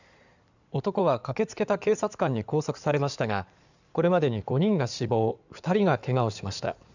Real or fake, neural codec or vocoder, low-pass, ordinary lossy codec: fake; codec, 16 kHz in and 24 kHz out, 2.2 kbps, FireRedTTS-2 codec; 7.2 kHz; none